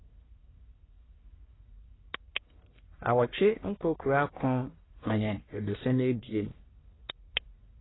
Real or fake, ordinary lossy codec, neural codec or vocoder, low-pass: fake; AAC, 16 kbps; codec, 44.1 kHz, 1.7 kbps, Pupu-Codec; 7.2 kHz